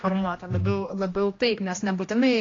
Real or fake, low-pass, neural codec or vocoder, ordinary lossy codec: fake; 7.2 kHz; codec, 16 kHz, 1 kbps, X-Codec, HuBERT features, trained on general audio; AAC, 32 kbps